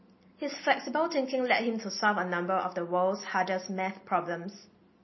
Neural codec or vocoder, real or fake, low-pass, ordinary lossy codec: none; real; 7.2 kHz; MP3, 24 kbps